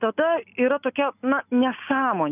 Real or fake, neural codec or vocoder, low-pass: real; none; 3.6 kHz